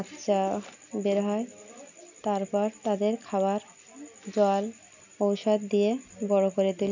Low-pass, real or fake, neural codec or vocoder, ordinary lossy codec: 7.2 kHz; real; none; none